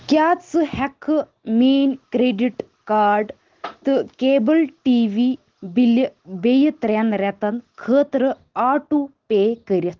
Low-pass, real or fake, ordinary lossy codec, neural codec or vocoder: 7.2 kHz; real; Opus, 16 kbps; none